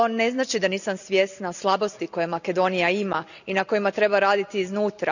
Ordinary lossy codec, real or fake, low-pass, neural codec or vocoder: none; real; 7.2 kHz; none